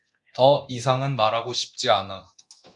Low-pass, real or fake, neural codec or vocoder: 10.8 kHz; fake; codec, 24 kHz, 0.9 kbps, DualCodec